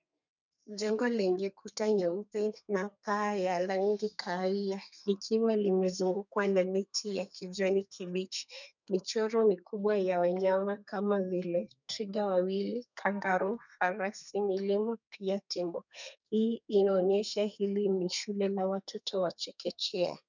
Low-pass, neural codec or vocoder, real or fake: 7.2 kHz; codec, 32 kHz, 1.9 kbps, SNAC; fake